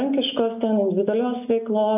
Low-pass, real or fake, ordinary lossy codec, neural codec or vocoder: 3.6 kHz; real; AAC, 32 kbps; none